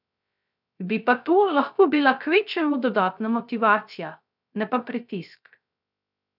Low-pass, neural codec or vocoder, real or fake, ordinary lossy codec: 5.4 kHz; codec, 16 kHz, 0.3 kbps, FocalCodec; fake; none